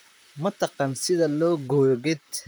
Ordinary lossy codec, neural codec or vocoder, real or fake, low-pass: none; vocoder, 44.1 kHz, 128 mel bands, Pupu-Vocoder; fake; none